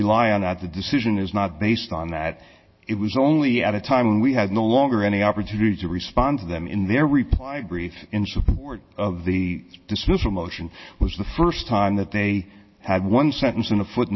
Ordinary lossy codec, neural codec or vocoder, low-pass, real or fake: MP3, 24 kbps; none; 7.2 kHz; real